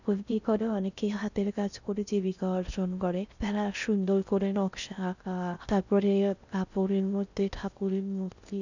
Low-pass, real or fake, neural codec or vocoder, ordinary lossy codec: 7.2 kHz; fake; codec, 16 kHz in and 24 kHz out, 0.6 kbps, FocalCodec, streaming, 4096 codes; none